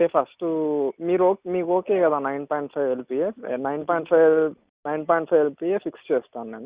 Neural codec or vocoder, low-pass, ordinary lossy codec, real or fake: none; 3.6 kHz; Opus, 64 kbps; real